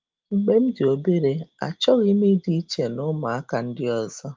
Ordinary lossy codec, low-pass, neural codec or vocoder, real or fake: Opus, 32 kbps; 7.2 kHz; none; real